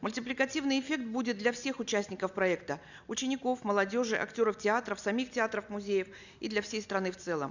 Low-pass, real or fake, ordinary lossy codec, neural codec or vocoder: 7.2 kHz; real; none; none